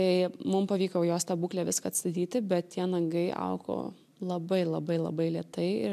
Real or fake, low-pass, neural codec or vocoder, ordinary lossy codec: real; 14.4 kHz; none; MP3, 96 kbps